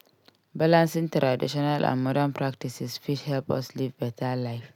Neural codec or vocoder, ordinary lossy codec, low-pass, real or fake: none; none; 19.8 kHz; real